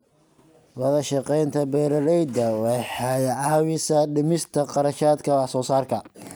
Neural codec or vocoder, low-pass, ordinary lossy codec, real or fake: none; none; none; real